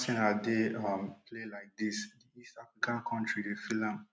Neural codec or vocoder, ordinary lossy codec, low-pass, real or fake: none; none; none; real